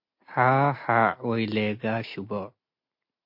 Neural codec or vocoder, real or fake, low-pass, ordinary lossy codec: none; real; 5.4 kHz; MP3, 32 kbps